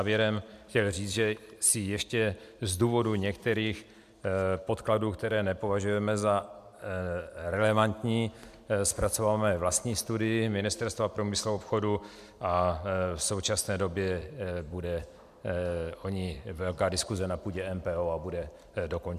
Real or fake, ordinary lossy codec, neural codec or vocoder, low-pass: fake; MP3, 96 kbps; vocoder, 44.1 kHz, 128 mel bands every 256 samples, BigVGAN v2; 14.4 kHz